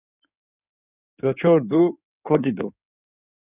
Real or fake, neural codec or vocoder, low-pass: fake; codec, 16 kHz in and 24 kHz out, 2.2 kbps, FireRedTTS-2 codec; 3.6 kHz